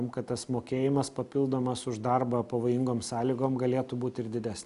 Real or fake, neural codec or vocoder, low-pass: real; none; 10.8 kHz